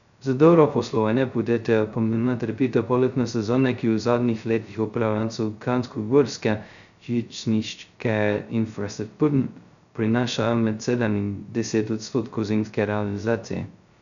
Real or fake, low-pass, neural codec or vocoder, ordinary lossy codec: fake; 7.2 kHz; codec, 16 kHz, 0.2 kbps, FocalCodec; none